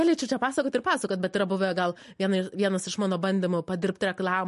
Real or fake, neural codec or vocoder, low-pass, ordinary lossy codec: real; none; 14.4 kHz; MP3, 48 kbps